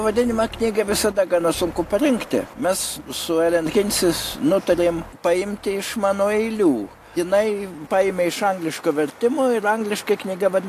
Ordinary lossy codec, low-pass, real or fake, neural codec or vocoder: AAC, 64 kbps; 14.4 kHz; real; none